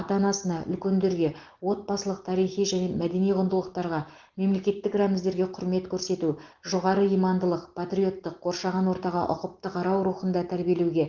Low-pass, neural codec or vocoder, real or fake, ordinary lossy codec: 7.2 kHz; none; real; Opus, 16 kbps